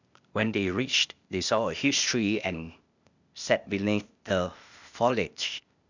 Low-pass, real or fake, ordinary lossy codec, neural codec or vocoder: 7.2 kHz; fake; none; codec, 16 kHz, 0.8 kbps, ZipCodec